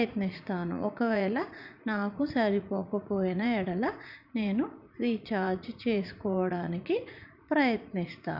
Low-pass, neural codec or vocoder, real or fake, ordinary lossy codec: 5.4 kHz; vocoder, 44.1 kHz, 80 mel bands, Vocos; fake; none